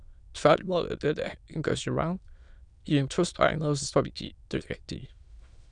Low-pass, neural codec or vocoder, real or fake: 9.9 kHz; autoencoder, 22.05 kHz, a latent of 192 numbers a frame, VITS, trained on many speakers; fake